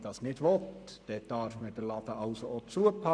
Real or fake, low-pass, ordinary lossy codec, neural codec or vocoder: fake; 9.9 kHz; none; codec, 44.1 kHz, 7.8 kbps, Pupu-Codec